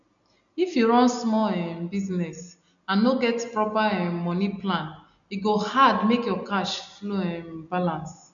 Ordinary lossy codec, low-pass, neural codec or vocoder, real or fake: none; 7.2 kHz; none; real